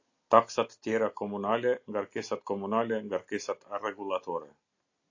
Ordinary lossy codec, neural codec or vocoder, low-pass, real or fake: AAC, 48 kbps; none; 7.2 kHz; real